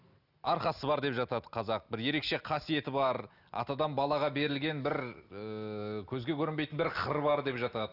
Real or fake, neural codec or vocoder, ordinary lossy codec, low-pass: real; none; none; 5.4 kHz